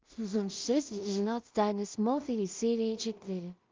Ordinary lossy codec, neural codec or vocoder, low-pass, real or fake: Opus, 24 kbps; codec, 16 kHz in and 24 kHz out, 0.4 kbps, LongCat-Audio-Codec, two codebook decoder; 7.2 kHz; fake